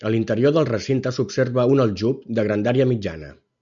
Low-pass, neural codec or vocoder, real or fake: 7.2 kHz; none; real